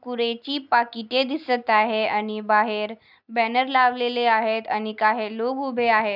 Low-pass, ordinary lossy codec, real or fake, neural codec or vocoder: 5.4 kHz; none; real; none